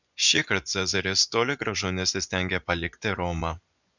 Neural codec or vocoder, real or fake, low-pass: vocoder, 24 kHz, 100 mel bands, Vocos; fake; 7.2 kHz